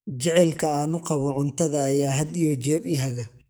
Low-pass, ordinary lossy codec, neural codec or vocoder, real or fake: none; none; codec, 44.1 kHz, 2.6 kbps, SNAC; fake